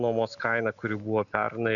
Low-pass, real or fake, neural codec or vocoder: 7.2 kHz; real; none